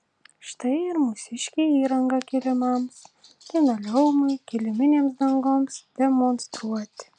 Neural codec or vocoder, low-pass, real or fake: none; 9.9 kHz; real